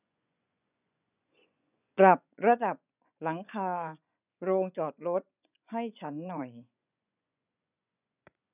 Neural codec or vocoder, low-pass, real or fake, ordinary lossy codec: vocoder, 24 kHz, 100 mel bands, Vocos; 3.6 kHz; fake; none